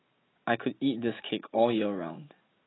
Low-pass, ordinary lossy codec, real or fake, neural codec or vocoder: 7.2 kHz; AAC, 16 kbps; real; none